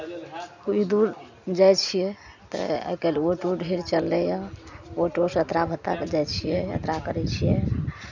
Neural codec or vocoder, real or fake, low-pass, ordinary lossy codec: none; real; 7.2 kHz; none